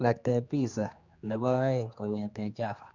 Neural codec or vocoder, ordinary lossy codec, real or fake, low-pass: codec, 16 kHz, 2 kbps, X-Codec, HuBERT features, trained on general audio; Opus, 64 kbps; fake; 7.2 kHz